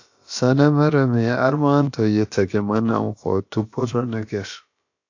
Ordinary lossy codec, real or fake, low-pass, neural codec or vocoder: AAC, 48 kbps; fake; 7.2 kHz; codec, 16 kHz, about 1 kbps, DyCAST, with the encoder's durations